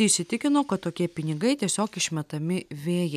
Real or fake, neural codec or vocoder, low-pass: real; none; 14.4 kHz